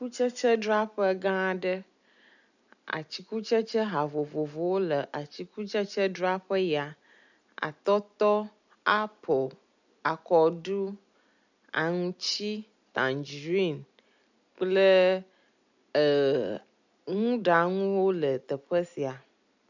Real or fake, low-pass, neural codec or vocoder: real; 7.2 kHz; none